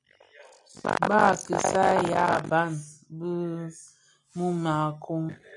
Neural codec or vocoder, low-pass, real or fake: none; 10.8 kHz; real